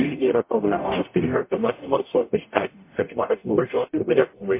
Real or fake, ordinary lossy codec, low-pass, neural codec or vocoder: fake; MP3, 24 kbps; 3.6 kHz; codec, 44.1 kHz, 0.9 kbps, DAC